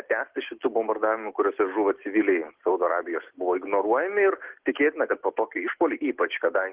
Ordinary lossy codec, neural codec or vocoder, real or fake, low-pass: Opus, 16 kbps; none; real; 3.6 kHz